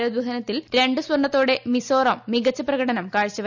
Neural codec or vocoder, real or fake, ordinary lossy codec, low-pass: none; real; none; 7.2 kHz